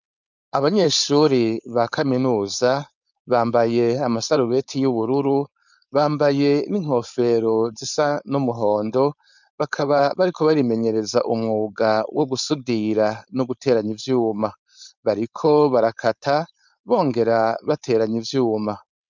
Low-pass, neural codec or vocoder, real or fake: 7.2 kHz; codec, 16 kHz, 4.8 kbps, FACodec; fake